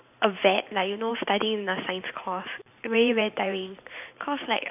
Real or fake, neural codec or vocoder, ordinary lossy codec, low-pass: fake; vocoder, 44.1 kHz, 128 mel bands every 512 samples, BigVGAN v2; none; 3.6 kHz